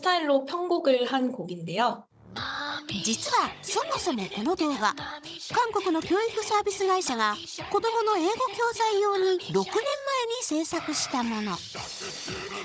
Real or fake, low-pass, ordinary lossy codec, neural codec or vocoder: fake; none; none; codec, 16 kHz, 16 kbps, FunCodec, trained on Chinese and English, 50 frames a second